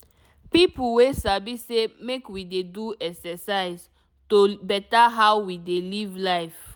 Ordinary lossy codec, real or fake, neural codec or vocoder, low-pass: none; real; none; none